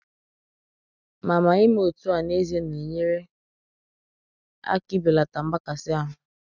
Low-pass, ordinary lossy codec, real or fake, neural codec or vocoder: 7.2 kHz; none; real; none